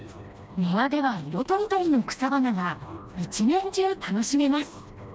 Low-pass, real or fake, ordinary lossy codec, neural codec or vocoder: none; fake; none; codec, 16 kHz, 1 kbps, FreqCodec, smaller model